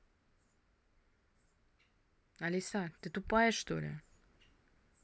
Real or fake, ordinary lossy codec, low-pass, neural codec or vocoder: real; none; none; none